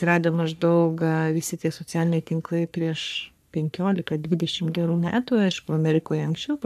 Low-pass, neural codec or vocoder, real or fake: 14.4 kHz; codec, 44.1 kHz, 3.4 kbps, Pupu-Codec; fake